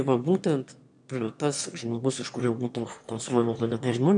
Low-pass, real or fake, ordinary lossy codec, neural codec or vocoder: 9.9 kHz; fake; MP3, 64 kbps; autoencoder, 22.05 kHz, a latent of 192 numbers a frame, VITS, trained on one speaker